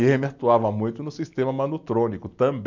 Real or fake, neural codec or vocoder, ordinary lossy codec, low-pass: real; none; none; 7.2 kHz